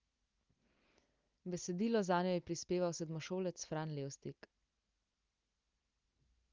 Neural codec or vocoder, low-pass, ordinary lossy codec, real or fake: none; 7.2 kHz; Opus, 32 kbps; real